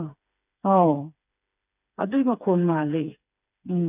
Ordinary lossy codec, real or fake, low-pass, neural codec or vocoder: none; fake; 3.6 kHz; codec, 16 kHz, 4 kbps, FreqCodec, smaller model